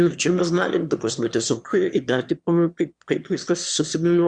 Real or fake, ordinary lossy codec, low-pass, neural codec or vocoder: fake; Opus, 64 kbps; 9.9 kHz; autoencoder, 22.05 kHz, a latent of 192 numbers a frame, VITS, trained on one speaker